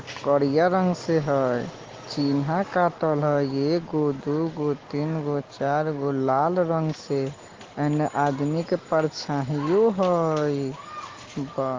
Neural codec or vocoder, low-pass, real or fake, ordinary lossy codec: none; 7.2 kHz; real; Opus, 16 kbps